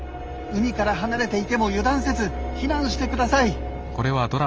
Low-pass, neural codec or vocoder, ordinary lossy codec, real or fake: 7.2 kHz; none; Opus, 24 kbps; real